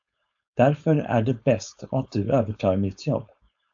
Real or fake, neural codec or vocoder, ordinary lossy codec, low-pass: fake; codec, 16 kHz, 4.8 kbps, FACodec; AAC, 64 kbps; 7.2 kHz